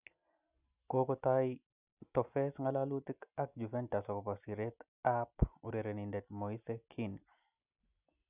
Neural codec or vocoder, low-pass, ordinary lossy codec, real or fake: none; 3.6 kHz; none; real